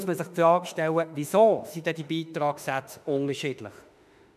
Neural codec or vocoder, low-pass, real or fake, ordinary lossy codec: autoencoder, 48 kHz, 32 numbers a frame, DAC-VAE, trained on Japanese speech; 14.4 kHz; fake; none